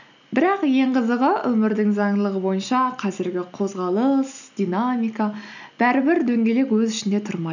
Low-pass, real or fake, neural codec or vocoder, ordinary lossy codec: 7.2 kHz; real; none; none